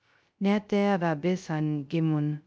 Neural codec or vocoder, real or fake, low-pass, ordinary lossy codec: codec, 16 kHz, 0.2 kbps, FocalCodec; fake; none; none